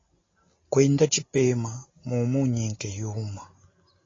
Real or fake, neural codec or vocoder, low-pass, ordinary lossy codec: real; none; 7.2 kHz; AAC, 32 kbps